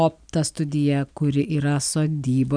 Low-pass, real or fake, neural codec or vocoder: 9.9 kHz; real; none